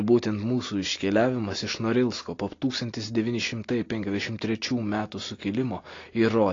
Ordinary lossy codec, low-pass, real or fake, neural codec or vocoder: AAC, 32 kbps; 7.2 kHz; real; none